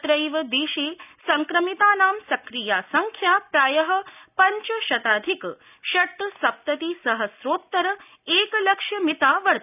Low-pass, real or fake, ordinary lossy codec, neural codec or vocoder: 3.6 kHz; real; none; none